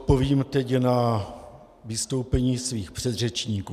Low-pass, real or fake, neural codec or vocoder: 14.4 kHz; real; none